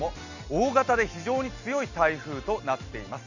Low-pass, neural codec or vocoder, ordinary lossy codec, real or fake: 7.2 kHz; none; none; real